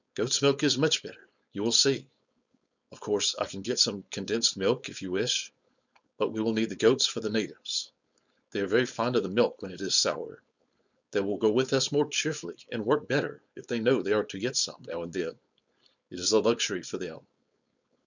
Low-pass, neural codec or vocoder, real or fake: 7.2 kHz; codec, 16 kHz, 4.8 kbps, FACodec; fake